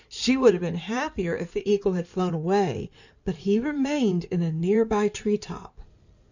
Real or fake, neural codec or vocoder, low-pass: fake; codec, 16 kHz in and 24 kHz out, 2.2 kbps, FireRedTTS-2 codec; 7.2 kHz